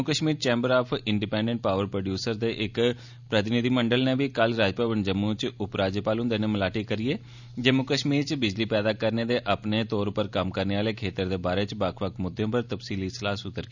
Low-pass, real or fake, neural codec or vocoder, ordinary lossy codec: 7.2 kHz; real; none; none